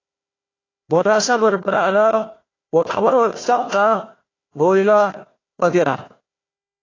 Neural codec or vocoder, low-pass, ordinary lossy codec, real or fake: codec, 16 kHz, 1 kbps, FunCodec, trained on Chinese and English, 50 frames a second; 7.2 kHz; AAC, 32 kbps; fake